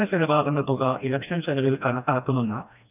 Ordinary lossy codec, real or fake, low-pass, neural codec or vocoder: none; fake; 3.6 kHz; codec, 16 kHz, 1 kbps, FreqCodec, smaller model